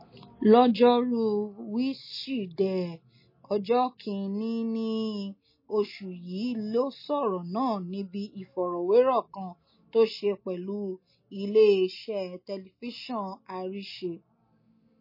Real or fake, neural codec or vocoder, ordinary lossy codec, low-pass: real; none; MP3, 24 kbps; 5.4 kHz